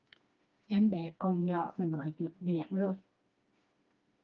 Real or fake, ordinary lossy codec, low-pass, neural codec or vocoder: fake; Opus, 24 kbps; 7.2 kHz; codec, 16 kHz, 2 kbps, FreqCodec, smaller model